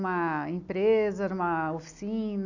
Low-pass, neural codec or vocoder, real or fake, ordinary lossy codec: 7.2 kHz; none; real; none